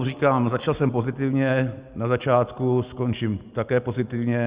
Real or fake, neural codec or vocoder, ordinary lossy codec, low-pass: real; none; Opus, 64 kbps; 3.6 kHz